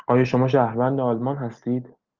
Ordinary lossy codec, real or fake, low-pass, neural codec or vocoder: Opus, 32 kbps; real; 7.2 kHz; none